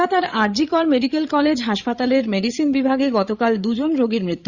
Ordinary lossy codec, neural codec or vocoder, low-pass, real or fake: Opus, 64 kbps; codec, 16 kHz, 8 kbps, FreqCodec, larger model; 7.2 kHz; fake